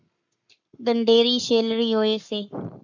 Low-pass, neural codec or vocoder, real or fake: 7.2 kHz; codec, 44.1 kHz, 7.8 kbps, Pupu-Codec; fake